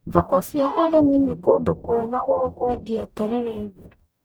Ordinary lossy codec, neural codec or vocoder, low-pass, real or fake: none; codec, 44.1 kHz, 0.9 kbps, DAC; none; fake